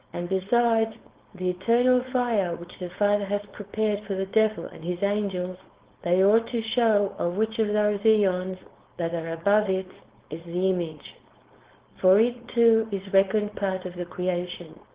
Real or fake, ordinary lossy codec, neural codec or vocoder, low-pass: fake; Opus, 16 kbps; codec, 16 kHz, 4.8 kbps, FACodec; 3.6 kHz